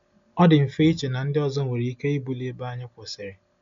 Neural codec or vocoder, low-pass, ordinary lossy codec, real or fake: none; 7.2 kHz; MP3, 64 kbps; real